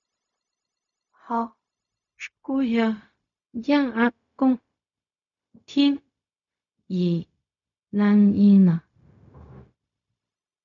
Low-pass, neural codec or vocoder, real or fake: 7.2 kHz; codec, 16 kHz, 0.4 kbps, LongCat-Audio-Codec; fake